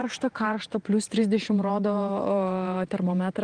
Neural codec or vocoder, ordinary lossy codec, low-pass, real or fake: vocoder, 48 kHz, 128 mel bands, Vocos; Opus, 24 kbps; 9.9 kHz; fake